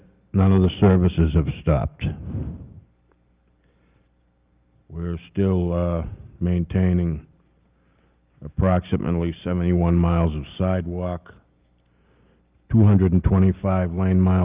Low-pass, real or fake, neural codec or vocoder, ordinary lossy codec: 3.6 kHz; real; none; Opus, 24 kbps